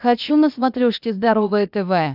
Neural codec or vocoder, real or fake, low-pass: codec, 16 kHz, 0.8 kbps, ZipCodec; fake; 5.4 kHz